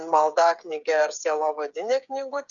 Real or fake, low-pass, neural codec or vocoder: fake; 7.2 kHz; codec, 16 kHz, 8 kbps, FreqCodec, smaller model